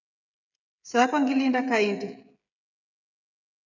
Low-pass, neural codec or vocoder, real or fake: 7.2 kHz; codec, 16 kHz, 16 kbps, FreqCodec, smaller model; fake